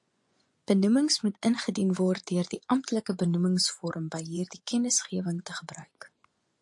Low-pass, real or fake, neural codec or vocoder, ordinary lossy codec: 10.8 kHz; fake; vocoder, 24 kHz, 100 mel bands, Vocos; AAC, 64 kbps